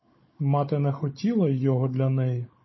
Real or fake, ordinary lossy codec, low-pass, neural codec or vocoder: fake; MP3, 24 kbps; 7.2 kHz; codec, 16 kHz, 16 kbps, FunCodec, trained on Chinese and English, 50 frames a second